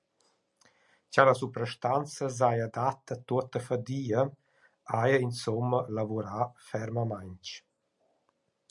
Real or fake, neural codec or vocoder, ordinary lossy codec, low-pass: real; none; MP3, 96 kbps; 10.8 kHz